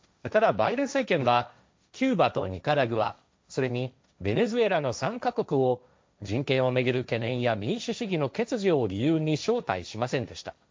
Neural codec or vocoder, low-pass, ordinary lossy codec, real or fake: codec, 16 kHz, 1.1 kbps, Voila-Tokenizer; none; none; fake